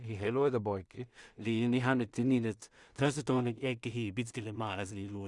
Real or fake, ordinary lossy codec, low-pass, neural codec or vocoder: fake; Opus, 64 kbps; 10.8 kHz; codec, 16 kHz in and 24 kHz out, 0.4 kbps, LongCat-Audio-Codec, two codebook decoder